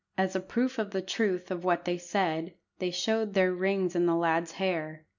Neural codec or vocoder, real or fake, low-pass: none; real; 7.2 kHz